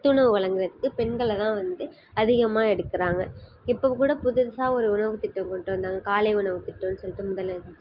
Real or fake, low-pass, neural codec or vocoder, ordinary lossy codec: real; 5.4 kHz; none; Opus, 24 kbps